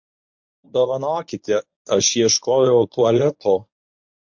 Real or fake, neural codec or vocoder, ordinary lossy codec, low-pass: fake; codec, 24 kHz, 0.9 kbps, WavTokenizer, medium speech release version 1; MP3, 48 kbps; 7.2 kHz